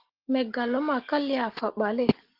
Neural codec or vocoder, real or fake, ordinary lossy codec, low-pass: none; real; Opus, 16 kbps; 5.4 kHz